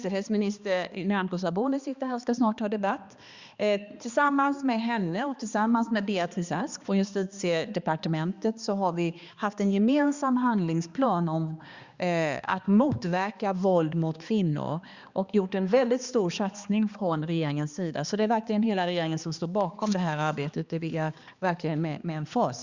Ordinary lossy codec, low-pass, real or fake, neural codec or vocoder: Opus, 64 kbps; 7.2 kHz; fake; codec, 16 kHz, 2 kbps, X-Codec, HuBERT features, trained on balanced general audio